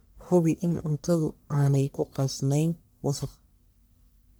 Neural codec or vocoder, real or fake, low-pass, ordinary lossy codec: codec, 44.1 kHz, 1.7 kbps, Pupu-Codec; fake; none; none